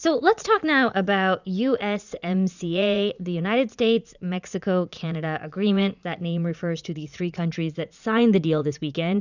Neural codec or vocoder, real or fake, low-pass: vocoder, 44.1 kHz, 80 mel bands, Vocos; fake; 7.2 kHz